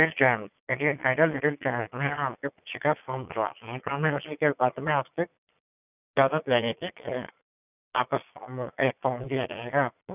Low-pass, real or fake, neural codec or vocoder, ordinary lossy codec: 3.6 kHz; fake; vocoder, 22.05 kHz, 80 mel bands, Vocos; none